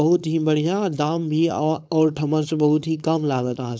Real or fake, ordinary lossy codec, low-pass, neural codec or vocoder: fake; none; none; codec, 16 kHz, 4.8 kbps, FACodec